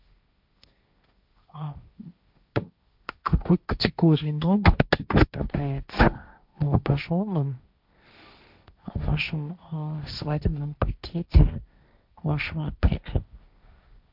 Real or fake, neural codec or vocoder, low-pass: fake; codec, 16 kHz, 1.1 kbps, Voila-Tokenizer; 5.4 kHz